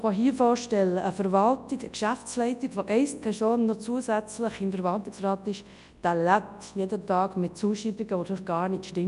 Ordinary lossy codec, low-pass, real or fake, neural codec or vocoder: none; 10.8 kHz; fake; codec, 24 kHz, 0.9 kbps, WavTokenizer, large speech release